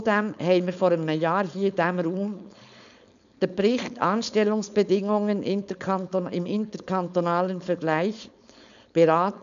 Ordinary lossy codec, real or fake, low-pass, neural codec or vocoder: none; fake; 7.2 kHz; codec, 16 kHz, 4.8 kbps, FACodec